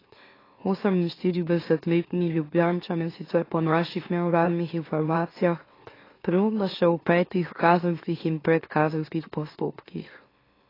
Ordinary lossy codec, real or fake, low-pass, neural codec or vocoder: AAC, 24 kbps; fake; 5.4 kHz; autoencoder, 44.1 kHz, a latent of 192 numbers a frame, MeloTTS